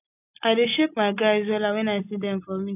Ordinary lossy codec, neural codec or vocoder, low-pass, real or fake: none; none; 3.6 kHz; real